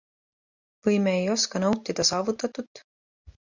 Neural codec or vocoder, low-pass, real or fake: none; 7.2 kHz; real